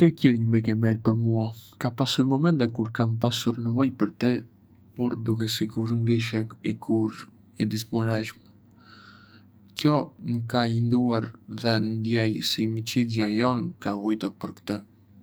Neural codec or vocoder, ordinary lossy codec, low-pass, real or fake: codec, 44.1 kHz, 2.6 kbps, SNAC; none; none; fake